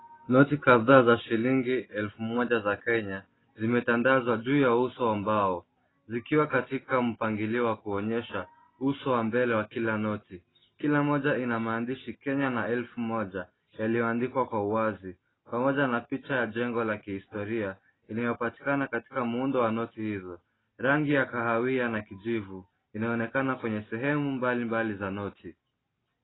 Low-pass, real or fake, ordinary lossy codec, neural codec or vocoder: 7.2 kHz; real; AAC, 16 kbps; none